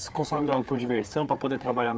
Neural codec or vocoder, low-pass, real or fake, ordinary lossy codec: codec, 16 kHz, 4 kbps, FreqCodec, larger model; none; fake; none